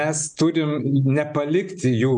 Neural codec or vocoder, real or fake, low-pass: none; real; 9.9 kHz